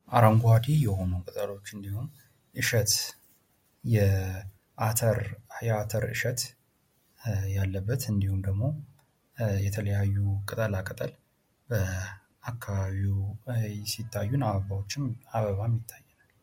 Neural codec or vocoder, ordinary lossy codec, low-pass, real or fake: none; MP3, 64 kbps; 19.8 kHz; real